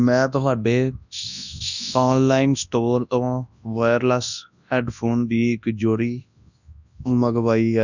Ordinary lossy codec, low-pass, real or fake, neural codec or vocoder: none; 7.2 kHz; fake; codec, 24 kHz, 0.9 kbps, WavTokenizer, large speech release